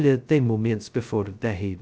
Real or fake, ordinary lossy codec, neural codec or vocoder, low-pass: fake; none; codec, 16 kHz, 0.2 kbps, FocalCodec; none